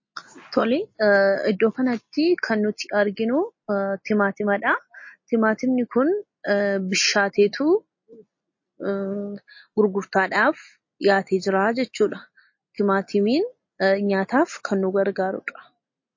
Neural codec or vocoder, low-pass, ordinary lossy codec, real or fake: none; 7.2 kHz; MP3, 32 kbps; real